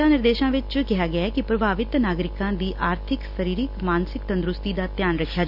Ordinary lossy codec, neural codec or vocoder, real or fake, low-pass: Opus, 64 kbps; none; real; 5.4 kHz